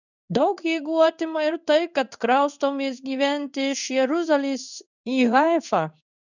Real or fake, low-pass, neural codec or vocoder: fake; 7.2 kHz; codec, 16 kHz in and 24 kHz out, 1 kbps, XY-Tokenizer